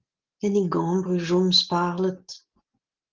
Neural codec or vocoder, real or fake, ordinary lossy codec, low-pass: none; real; Opus, 16 kbps; 7.2 kHz